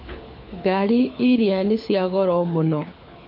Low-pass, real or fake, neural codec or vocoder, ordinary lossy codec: 5.4 kHz; fake; codec, 24 kHz, 6 kbps, HILCodec; MP3, 32 kbps